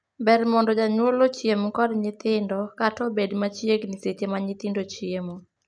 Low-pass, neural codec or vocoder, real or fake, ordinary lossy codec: 9.9 kHz; none; real; none